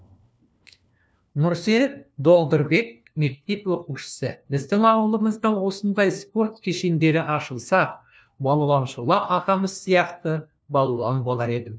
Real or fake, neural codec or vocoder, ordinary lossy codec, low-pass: fake; codec, 16 kHz, 1 kbps, FunCodec, trained on LibriTTS, 50 frames a second; none; none